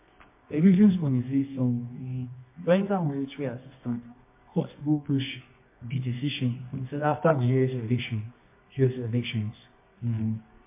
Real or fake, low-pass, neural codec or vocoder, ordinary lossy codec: fake; 3.6 kHz; codec, 24 kHz, 0.9 kbps, WavTokenizer, medium music audio release; MP3, 24 kbps